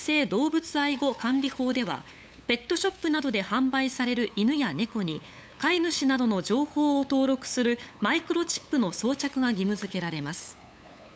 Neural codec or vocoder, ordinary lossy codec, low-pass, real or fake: codec, 16 kHz, 8 kbps, FunCodec, trained on LibriTTS, 25 frames a second; none; none; fake